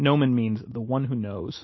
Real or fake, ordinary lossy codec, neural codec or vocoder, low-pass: real; MP3, 24 kbps; none; 7.2 kHz